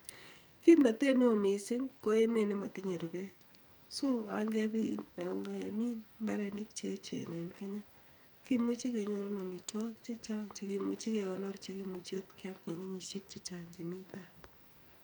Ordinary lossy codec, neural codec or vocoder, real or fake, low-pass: none; codec, 44.1 kHz, 2.6 kbps, SNAC; fake; none